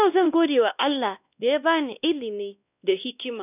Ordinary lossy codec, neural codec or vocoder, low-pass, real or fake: none; codec, 16 kHz, 1 kbps, X-Codec, WavLM features, trained on Multilingual LibriSpeech; 3.6 kHz; fake